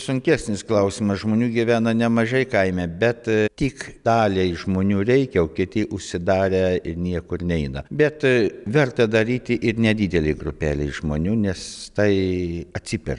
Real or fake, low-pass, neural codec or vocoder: real; 10.8 kHz; none